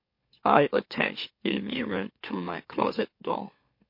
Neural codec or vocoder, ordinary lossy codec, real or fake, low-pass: autoencoder, 44.1 kHz, a latent of 192 numbers a frame, MeloTTS; MP3, 32 kbps; fake; 5.4 kHz